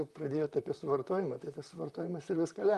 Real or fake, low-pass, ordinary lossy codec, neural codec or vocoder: fake; 14.4 kHz; Opus, 32 kbps; vocoder, 44.1 kHz, 128 mel bands, Pupu-Vocoder